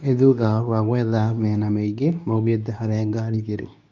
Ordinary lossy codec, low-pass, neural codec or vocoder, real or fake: none; 7.2 kHz; codec, 24 kHz, 0.9 kbps, WavTokenizer, medium speech release version 2; fake